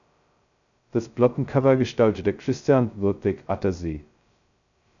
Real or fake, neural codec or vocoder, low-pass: fake; codec, 16 kHz, 0.2 kbps, FocalCodec; 7.2 kHz